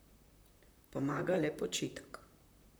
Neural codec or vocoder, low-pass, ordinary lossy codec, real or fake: vocoder, 44.1 kHz, 128 mel bands, Pupu-Vocoder; none; none; fake